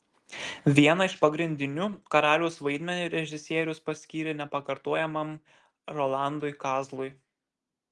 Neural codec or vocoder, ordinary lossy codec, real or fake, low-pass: none; Opus, 24 kbps; real; 10.8 kHz